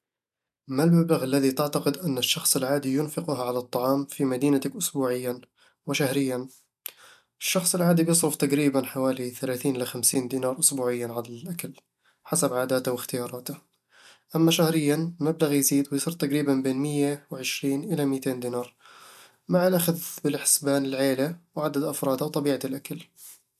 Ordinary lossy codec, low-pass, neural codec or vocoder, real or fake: MP3, 96 kbps; 14.4 kHz; none; real